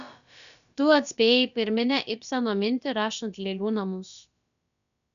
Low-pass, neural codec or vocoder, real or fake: 7.2 kHz; codec, 16 kHz, about 1 kbps, DyCAST, with the encoder's durations; fake